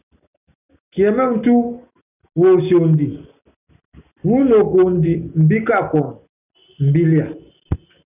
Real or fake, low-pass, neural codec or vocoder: real; 3.6 kHz; none